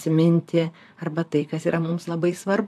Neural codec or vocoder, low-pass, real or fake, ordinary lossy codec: vocoder, 44.1 kHz, 128 mel bands, Pupu-Vocoder; 14.4 kHz; fake; AAC, 96 kbps